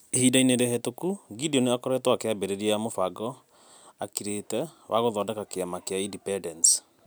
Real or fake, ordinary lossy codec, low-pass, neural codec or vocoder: real; none; none; none